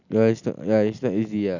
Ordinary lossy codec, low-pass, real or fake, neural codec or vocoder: none; 7.2 kHz; real; none